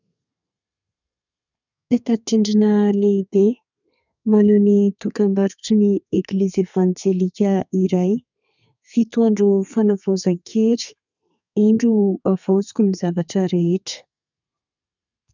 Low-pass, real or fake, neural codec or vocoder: 7.2 kHz; fake; codec, 32 kHz, 1.9 kbps, SNAC